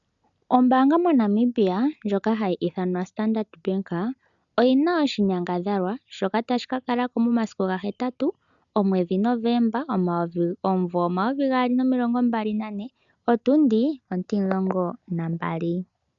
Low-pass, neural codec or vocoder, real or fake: 7.2 kHz; none; real